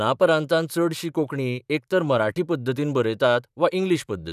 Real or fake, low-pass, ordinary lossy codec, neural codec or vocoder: fake; 14.4 kHz; none; vocoder, 44.1 kHz, 128 mel bands, Pupu-Vocoder